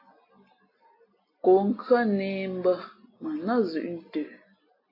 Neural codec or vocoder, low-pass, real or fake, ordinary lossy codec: none; 5.4 kHz; real; AAC, 24 kbps